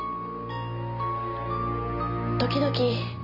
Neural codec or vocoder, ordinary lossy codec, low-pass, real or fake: none; none; 5.4 kHz; real